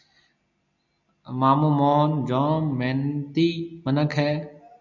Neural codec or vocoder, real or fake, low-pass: none; real; 7.2 kHz